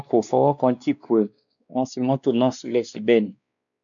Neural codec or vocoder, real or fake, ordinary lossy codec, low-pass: codec, 16 kHz, 2 kbps, X-Codec, WavLM features, trained on Multilingual LibriSpeech; fake; none; 7.2 kHz